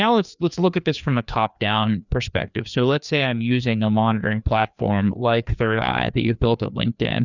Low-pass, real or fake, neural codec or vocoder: 7.2 kHz; fake; codec, 16 kHz, 2 kbps, FreqCodec, larger model